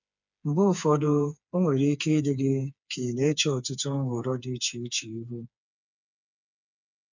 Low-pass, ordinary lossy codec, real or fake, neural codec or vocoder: 7.2 kHz; none; fake; codec, 16 kHz, 4 kbps, FreqCodec, smaller model